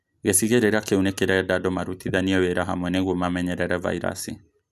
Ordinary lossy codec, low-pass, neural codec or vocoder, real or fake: none; 14.4 kHz; none; real